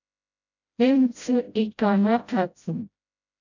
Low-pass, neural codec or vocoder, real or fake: 7.2 kHz; codec, 16 kHz, 0.5 kbps, FreqCodec, smaller model; fake